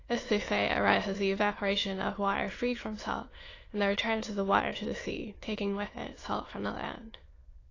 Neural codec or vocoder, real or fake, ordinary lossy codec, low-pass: autoencoder, 22.05 kHz, a latent of 192 numbers a frame, VITS, trained on many speakers; fake; AAC, 32 kbps; 7.2 kHz